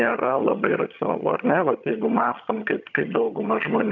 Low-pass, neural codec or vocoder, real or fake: 7.2 kHz; vocoder, 22.05 kHz, 80 mel bands, HiFi-GAN; fake